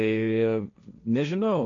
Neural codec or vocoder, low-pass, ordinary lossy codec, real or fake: codec, 16 kHz, 1.1 kbps, Voila-Tokenizer; 7.2 kHz; MP3, 96 kbps; fake